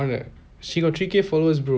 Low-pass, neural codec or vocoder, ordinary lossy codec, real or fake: none; none; none; real